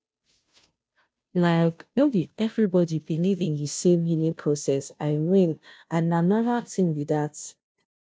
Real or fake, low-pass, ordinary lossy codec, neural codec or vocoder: fake; none; none; codec, 16 kHz, 0.5 kbps, FunCodec, trained on Chinese and English, 25 frames a second